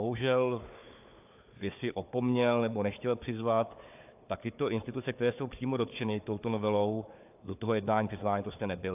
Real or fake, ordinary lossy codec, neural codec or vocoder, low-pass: fake; AAC, 32 kbps; codec, 16 kHz, 4 kbps, FunCodec, trained on Chinese and English, 50 frames a second; 3.6 kHz